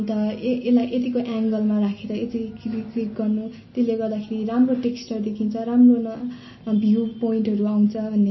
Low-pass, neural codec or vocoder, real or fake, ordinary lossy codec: 7.2 kHz; none; real; MP3, 24 kbps